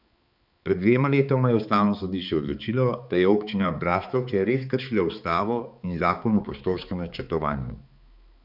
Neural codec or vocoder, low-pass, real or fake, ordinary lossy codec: codec, 16 kHz, 4 kbps, X-Codec, HuBERT features, trained on balanced general audio; 5.4 kHz; fake; none